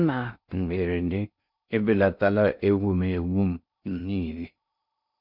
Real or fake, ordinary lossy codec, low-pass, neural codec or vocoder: fake; none; 5.4 kHz; codec, 16 kHz in and 24 kHz out, 0.6 kbps, FocalCodec, streaming, 2048 codes